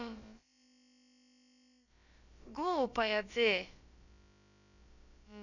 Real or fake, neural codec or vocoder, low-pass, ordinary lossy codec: fake; codec, 16 kHz, about 1 kbps, DyCAST, with the encoder's durations; 7.2 kHz; none